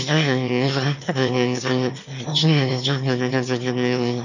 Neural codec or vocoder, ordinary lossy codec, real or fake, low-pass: autoencoder, 22.05 kHz, a latent of 192 numbers a frame, VITS, trained on one speaker; none; fake; 7.2 kHz